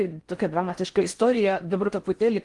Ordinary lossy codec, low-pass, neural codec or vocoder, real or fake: Opus, 24 kbps; 10.8 kHz; codec, 16 kHz in and 24 kHz out, 0.6 kbps, FocalCodec, streaming, 4096 codes; fake